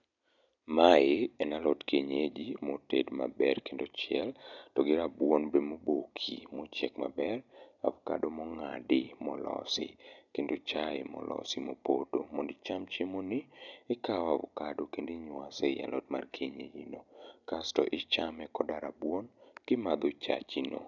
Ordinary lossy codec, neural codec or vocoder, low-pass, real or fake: none; none; 7.2 kHz; real